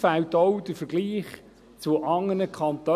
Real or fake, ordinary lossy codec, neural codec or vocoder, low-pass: real; none; none; 14.4 kHz